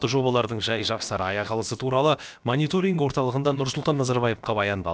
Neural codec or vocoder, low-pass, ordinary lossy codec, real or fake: codec, 16 kHz, about 1 kbps, DyCAST, with the encoder's durations; none; none; fake